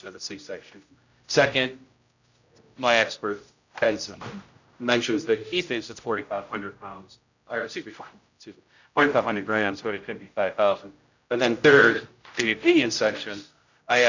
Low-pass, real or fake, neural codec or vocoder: 7.2 kHz; fake; codec, 16 kHz, 0.5 kbps, X-Codec, HuBERT features, trained on general audio